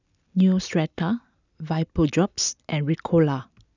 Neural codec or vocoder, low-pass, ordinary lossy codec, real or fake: none; 7.2 kHz; none; real